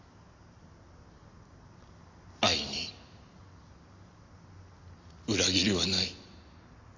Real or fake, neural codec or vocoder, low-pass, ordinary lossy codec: real; none; 7.2 kHz; AAC, 48 kbps